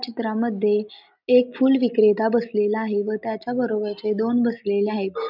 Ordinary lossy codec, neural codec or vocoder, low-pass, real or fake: none; none; 5.4 kHz; real